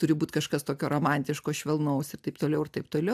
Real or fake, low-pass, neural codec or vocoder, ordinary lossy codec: real; 14.4 kHz; none; AAC, 96 kbps